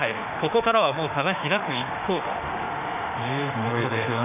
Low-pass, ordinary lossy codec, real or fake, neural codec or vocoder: 3.6 kHz; none; fake; autoencoder, 48 kHz, 32 numbers a frame, DAC-VAE, trained on Japanese speech